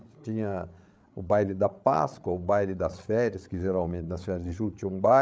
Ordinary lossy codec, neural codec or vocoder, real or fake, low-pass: none; codec, 16 kHz, 8 kbps, FreqCodec, larger model; fake; none